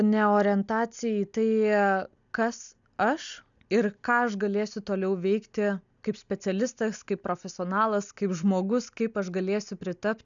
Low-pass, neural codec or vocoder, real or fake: 7.2 kHz; none; real